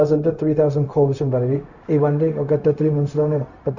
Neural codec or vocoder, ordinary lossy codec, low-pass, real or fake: codec, 16 kHz, 0.4 kbps, LongCat-Audio-Codec; none; 7.2 kHz; fake